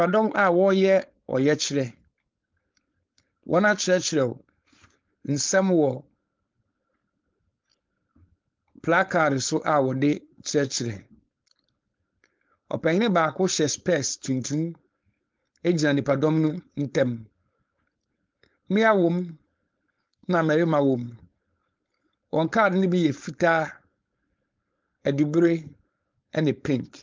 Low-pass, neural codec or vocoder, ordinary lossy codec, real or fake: 7.2 kHz; codec, 16 kHz, 4.8 kbps, FACodec; Opus, 32 kbps; fake